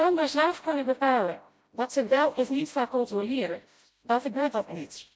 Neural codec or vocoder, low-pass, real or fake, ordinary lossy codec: codec, 16 kHz, 0.5 kbps, FreqCodec, smaller model; none; fake; none